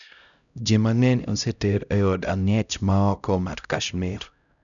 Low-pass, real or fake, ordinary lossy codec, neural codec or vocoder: 7.2 kHz; fake; none; codec, 16 kHz, 0.5 kbps, X-Codec, HuBERT features, trained on LibriSpeech